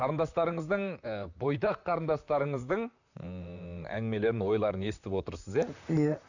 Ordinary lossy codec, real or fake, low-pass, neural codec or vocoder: none; fake; 7.2 kHz; vocoder, 44.1 kHz, 128 mel bands, Pupu-Vocoder